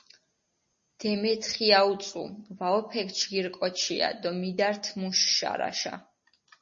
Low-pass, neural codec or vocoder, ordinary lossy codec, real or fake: 7.2 kHz; none; MP3, 32 kbps; real